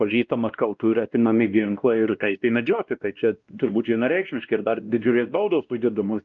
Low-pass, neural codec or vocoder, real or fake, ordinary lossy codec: 7.2 kHz; codec, 16 kHz, 1 kbps, X-Codec, WavLM features, trained on Multilingual LibriSpeech; fake; Opus, 24 kbps